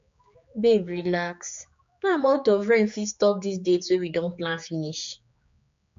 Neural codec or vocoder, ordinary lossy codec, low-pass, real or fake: codec, 16 kHz, 4 kbps, X-Codec, HuBERT features, trained on general audio; MP3, 48 kbps; 7.2 kHz; fake